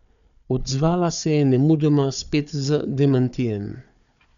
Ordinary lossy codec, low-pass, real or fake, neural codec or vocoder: none; 7.2 kHz; fake; codec, 16 kHz, 4 kbps, FunCodec, trained on Chinese and English, 50 frames a second